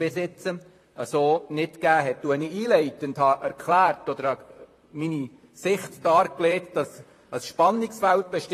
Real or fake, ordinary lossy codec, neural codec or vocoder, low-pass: fake; AAC, 48 kbps; vocoder, 44.1 kHz, 128 mel bands, Pupu-Vocoder; 14.4 kHz